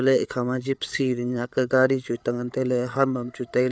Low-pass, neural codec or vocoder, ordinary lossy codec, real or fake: none; codec, 16 kHz, 8 kbps, FreqCodec, larger model; none; fake